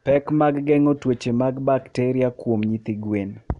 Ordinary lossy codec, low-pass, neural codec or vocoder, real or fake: none; 10.8 kHz; none; real